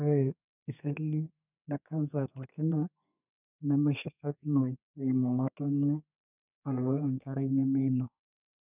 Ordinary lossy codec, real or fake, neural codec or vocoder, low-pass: none; fake; codec, 32 kHz, 1.9 kbps, SNAC; 3.6 kHz